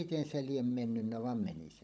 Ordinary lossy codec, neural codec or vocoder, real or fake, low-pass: none; codec, 16 kHz, 16 kbps, FunCodec, trained on Chinese and English, 50 frames a second; fake; none